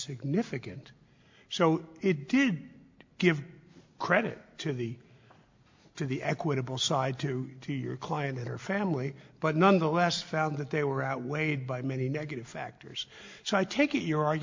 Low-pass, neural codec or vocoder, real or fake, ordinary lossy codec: 7.2 kHz; none; real; MP3, 48 kbps